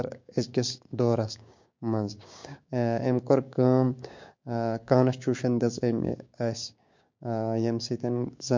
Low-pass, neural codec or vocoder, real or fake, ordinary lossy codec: 7.2 kHz; codec, 16 kHz, 6 kbps, DAC; fake; MP3, 48 kbps